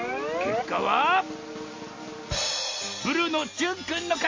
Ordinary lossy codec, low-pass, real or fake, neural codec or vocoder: MP3, 32 kbps; 7.2 kHz; real; none